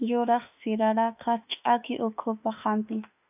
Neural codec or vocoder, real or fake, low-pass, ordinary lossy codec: autoencoder, 48 kHz, 32 numbers a frame, DAC-VAE, trained on Japanese speech; fake; 3.6 kHz; AAC, 32 kbps